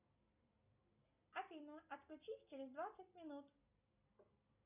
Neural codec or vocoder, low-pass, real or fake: none; 3.6 kHz; real